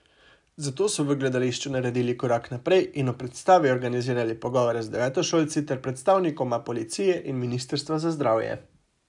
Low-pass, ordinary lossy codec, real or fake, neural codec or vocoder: 10.8 kHz; none; real; none